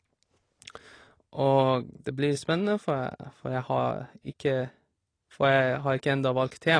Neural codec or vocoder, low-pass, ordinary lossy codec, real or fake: none; 9.9 kHz; AAC, 32 kbps; real